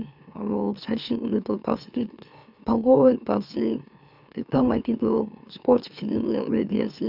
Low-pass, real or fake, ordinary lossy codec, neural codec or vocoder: 5.4 kHz; fake; none; autoencoder, 44.1 kHz, a latent of 192 numbers a frame, MeloTTS